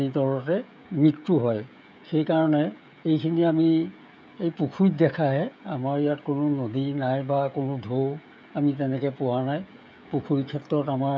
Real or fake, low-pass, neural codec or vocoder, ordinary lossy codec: fake; none; codec, 16 kHz, 16 kbps, FreqCodec, smaller model; none